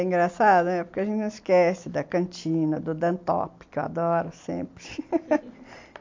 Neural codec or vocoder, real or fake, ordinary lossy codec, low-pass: none; real; MP3, 48 kbps; 7.2 kHz